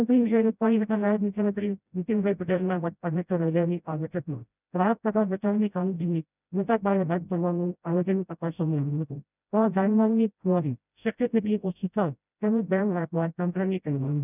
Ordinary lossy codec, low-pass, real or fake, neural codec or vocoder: none; 3.6 kHz; fake; codec, 16 kHz, 0.5 kbps, FreqCodec, smaller model